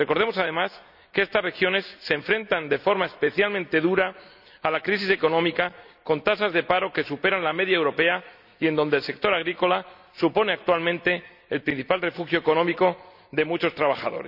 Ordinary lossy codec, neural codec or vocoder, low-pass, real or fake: none; none; 5.4 kHz; real